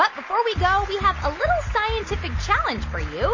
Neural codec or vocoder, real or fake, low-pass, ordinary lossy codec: none; real; 7.2 kHz; MP3, 32 kbps